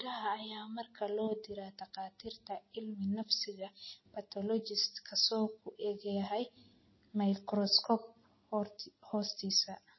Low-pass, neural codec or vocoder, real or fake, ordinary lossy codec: 7.2 kHz; none; real; MP3, 24 kbps